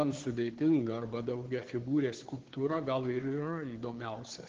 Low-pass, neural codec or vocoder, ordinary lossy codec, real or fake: 7.2 kHz; codec, 16 kHz, 2 kbps, FunCodec, trained on Chinese and English, 25 frames a second; Opus, 16 kbps; fake